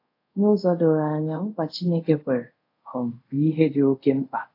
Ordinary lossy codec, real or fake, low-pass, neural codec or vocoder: AAC, 32 kbps; fake; 5.4 kHz; codec, 24 kHz, 0.5 kbps, DualCodec